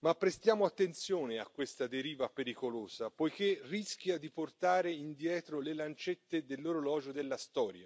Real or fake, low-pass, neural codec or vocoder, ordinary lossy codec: real; none; none; none